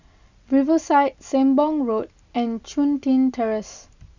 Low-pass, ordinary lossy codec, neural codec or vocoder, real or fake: 7.2 kHz; none; none; real